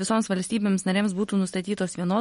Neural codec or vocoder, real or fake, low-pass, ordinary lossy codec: none; real; 19.8 kHz; MP3, 48 kbps